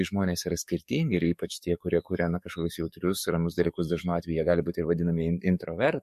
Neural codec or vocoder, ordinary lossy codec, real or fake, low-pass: codec, 44.1 kHz, 7.8 kbps, DAC; MP3, 64 kbps; fake; 14.4 kHz